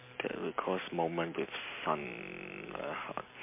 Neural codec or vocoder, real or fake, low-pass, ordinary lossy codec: none; real; 3.6 kHz; MP3, 24 kbps